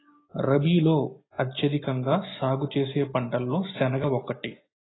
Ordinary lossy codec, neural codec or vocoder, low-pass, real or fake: AAC, 16 kbps; none; 7.2 kHz; real